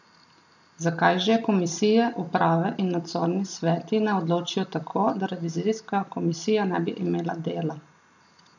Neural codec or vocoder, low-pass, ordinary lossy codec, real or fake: none; 7.2 kHz; none; real